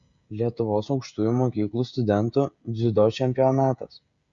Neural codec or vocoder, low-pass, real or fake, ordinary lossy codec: codec, 16 kHz, 16 kbps, FreqCodec, smaller model; 7.2 kHz; fake; Opus, 64 kbps